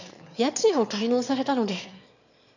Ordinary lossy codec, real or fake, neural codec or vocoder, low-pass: none; fake; autoencoder, 22.05 kHz, a latent of 192 numbers a frame, VITS, trained on one speaker; 7.2 kHz